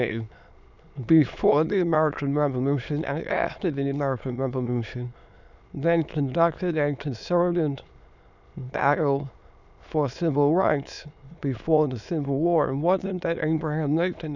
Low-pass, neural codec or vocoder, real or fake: 7.2 kHz; autoencoder, 22.05 kHz, a latent of 192 numbers a frame, VITS, trained on many speakers; fake